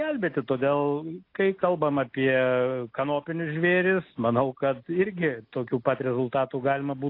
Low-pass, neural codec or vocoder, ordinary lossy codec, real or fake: 5.4 kHz; none; AAC, 32 kbps; real